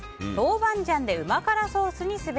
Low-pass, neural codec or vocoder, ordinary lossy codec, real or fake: none; none; none; real